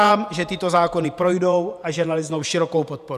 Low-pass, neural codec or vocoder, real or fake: 14.4 kHz; vocoder, 48 kHz, 128 mel bands, Vocos; fake